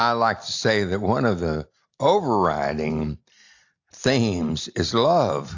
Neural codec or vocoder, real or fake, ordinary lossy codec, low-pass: none; real; AAC, 48 kbps; 7.2 kHz